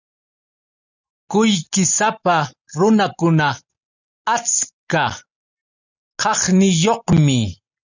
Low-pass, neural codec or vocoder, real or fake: 7.2 kHz; none; real